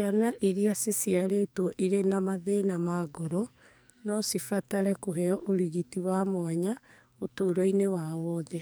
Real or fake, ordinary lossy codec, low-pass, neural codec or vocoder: fake; none; none; codec, 44.1 kHz, 2.6 kbps, SNAC